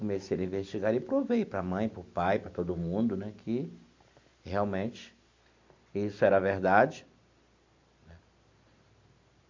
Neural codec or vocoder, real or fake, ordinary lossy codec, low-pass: none; real; MP3, 48 kbps; 7.2 kHz